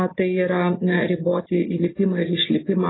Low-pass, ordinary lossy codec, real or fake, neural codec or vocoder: 7.2 kHz; AAC, 16 kbps; real; none